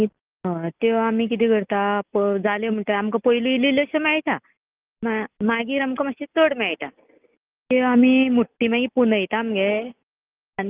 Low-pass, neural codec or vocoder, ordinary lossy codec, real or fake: 3.6 kHz; none; Opus, 32 kbps; real